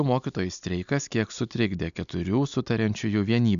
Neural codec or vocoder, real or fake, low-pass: none; real; 7.2 kHz